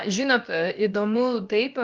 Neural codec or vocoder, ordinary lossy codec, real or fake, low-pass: codec, 16 kHz, about 1 kbps, DyCAST, with the encoder's durations; Opus, 24 kbps; fake; 7.2 kHz